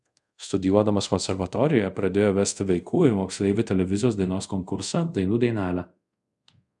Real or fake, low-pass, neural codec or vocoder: fake; 10.8 kHz; codec, 24 kHz, 0.5 kbps, DualCodec